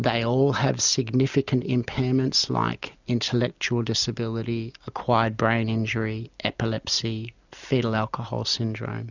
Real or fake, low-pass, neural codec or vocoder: real; 7.2 kHz; none